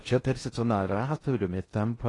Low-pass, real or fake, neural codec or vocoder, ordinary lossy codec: 10.8 kHz; fake; codec, 16 kHz in and 24 kHz out, 0.6 kbps, FocalCodec, streaming, 2048 codes; AAC, 32 kbps